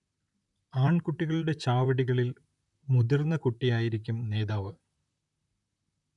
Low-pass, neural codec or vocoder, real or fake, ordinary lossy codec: 10.8 kHz; vocoder, 44.1 kHz, 128 mel bands, Pupu-Vocoder; fake; none